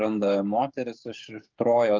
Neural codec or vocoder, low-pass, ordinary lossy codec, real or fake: codec, 16 kHz, 8 kbps, FreqCodec, smaller model; 7.2 kHz; Opus, 24 kbps; fake